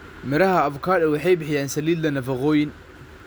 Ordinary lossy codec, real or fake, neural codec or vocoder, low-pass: none; real; none; none